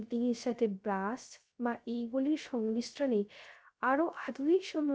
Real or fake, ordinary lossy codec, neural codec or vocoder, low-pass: fake; none; codec, 16 kHz, 0.3 kbps, FocalCodec; none